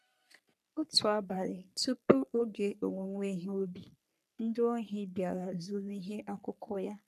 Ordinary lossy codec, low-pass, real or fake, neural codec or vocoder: none; 14.4 kHz; fake; codec, 44.1 kHz, 3.4 kbps, Pupu-Codec